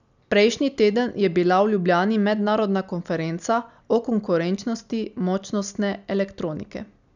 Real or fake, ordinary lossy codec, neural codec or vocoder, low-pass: real; none; none; 7.2 kHz